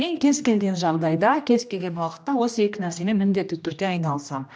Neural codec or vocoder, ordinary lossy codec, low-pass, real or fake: codec, 16 kHz, 1 kbps, X-Codec, HuBERT features, trained on general audio; none; none; fake